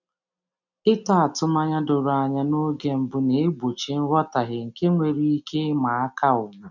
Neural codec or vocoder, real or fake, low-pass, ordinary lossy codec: none; real; 7.2 kHz; none